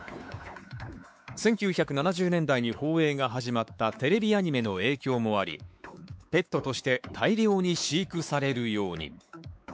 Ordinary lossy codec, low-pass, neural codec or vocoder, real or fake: none; none; codec, 16 kHz, 4 kbps, X-Codec, WavLM features, trained on Multilingual LibriSpeech; fake